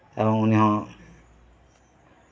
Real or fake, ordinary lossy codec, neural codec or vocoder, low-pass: real; none; none; none